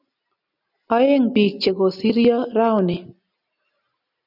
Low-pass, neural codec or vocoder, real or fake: 5.4 kHz; none; real